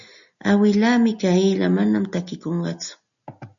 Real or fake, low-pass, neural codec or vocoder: real; 7.2 kHz; none